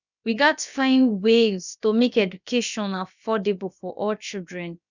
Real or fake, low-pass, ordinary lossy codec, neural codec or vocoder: fake; 7.2 kHz; none; codec, 16 kHz, about 1 kbps, DyCAST, with the encoder's durations